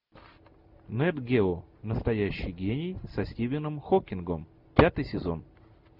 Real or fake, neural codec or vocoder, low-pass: real; none; 5.4 kHz